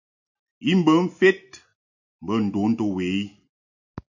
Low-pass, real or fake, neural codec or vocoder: 7.2 kHz; real; none